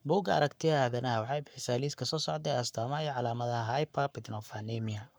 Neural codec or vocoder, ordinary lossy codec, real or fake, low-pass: codec, 44.1 kHz, 7.8 kbps, Pupu-Codec; none; fake; none